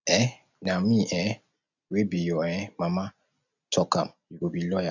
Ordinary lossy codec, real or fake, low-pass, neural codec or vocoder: none; real; 7.2 kHz; none